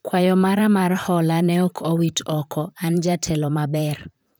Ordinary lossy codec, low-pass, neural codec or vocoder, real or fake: none; none; vocoder, 44.1 kHz, 128 mel bands, Pupu-Vocoder; fake